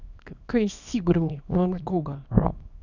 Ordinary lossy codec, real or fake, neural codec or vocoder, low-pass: none; fake; codec, 24 kHz, 0.9 kbps, WavTokenizer, small release; 7.2 kHz